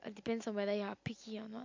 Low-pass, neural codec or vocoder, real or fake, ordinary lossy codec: 7.2 kHz; none; real; MP3, 64 kbps